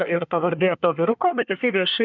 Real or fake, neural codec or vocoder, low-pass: fake; codec, 24 kHz, 1 kbps, SNAC; 7.2 kHz